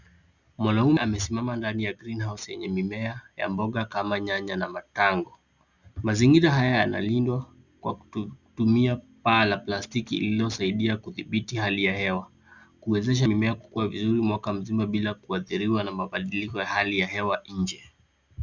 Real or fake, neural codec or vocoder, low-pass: real; none; 7.2 kHz